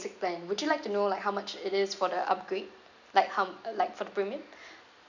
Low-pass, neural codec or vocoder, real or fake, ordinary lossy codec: 7.2 kHz; none; real; none